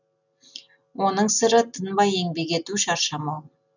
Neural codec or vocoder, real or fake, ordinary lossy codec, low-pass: none; real; none; 7.2 kHz